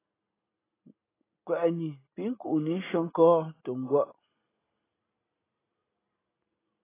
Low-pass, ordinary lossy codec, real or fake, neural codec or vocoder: 3.6 kHz; AAC, 16 kbps; real; none